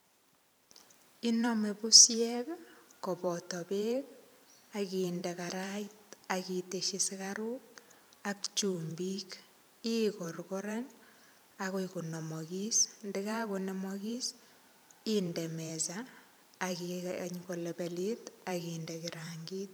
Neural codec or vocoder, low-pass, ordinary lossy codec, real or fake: vocoder, 44.1 kHz, 128 mel bands every 512 samples, BigVGAN v2; none; none; fake